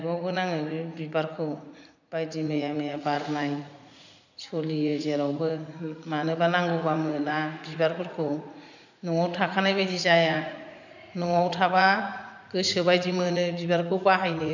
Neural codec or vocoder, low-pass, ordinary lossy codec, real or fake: vocoder, 44.1 kHz, 80 mel bands, Vocos; 7.2 kHz; none; fake